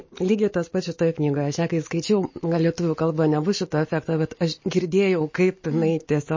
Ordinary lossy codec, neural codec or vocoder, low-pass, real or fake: MP3, 32 kbps; none; 7.2 kHz; real